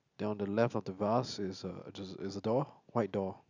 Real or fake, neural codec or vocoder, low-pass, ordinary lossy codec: real; none; 7.2 kHz; none